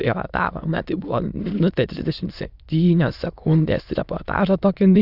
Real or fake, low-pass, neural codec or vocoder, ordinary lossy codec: fake; 5.4 kHz; autoencoder, 22.05 kHz, a latent of 192 numbers a frame, VITS, trained on many speakers; Opus, 64 kbps